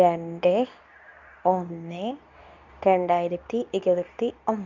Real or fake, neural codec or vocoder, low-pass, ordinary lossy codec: fake; codec, 24 kHz, 0.9 kbps, WavTokenizer, medium speech release version 2; 7.2 kHz; none